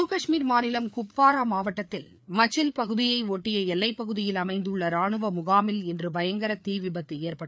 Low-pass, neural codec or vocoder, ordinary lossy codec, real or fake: none; codec, 16 kHz, 4 kbps, FreqCodec, larger model; none; fake